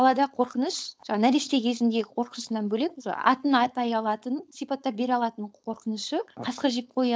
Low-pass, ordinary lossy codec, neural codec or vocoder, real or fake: none; none; codec, 16 kHz, 4.8 kbps, FACodec; fake